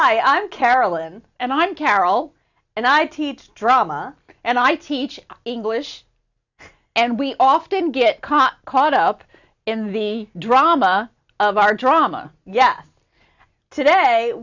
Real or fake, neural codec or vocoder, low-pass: real; none; 7.2 kHz